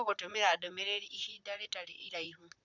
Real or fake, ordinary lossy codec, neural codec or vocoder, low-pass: fake; none; vocoder, 44.1 kHz, 128 mel bands, Pupu-Vocoder; 7.2 kHz